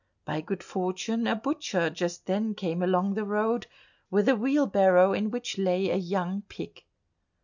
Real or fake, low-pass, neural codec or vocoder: real; 7.2 kHz; none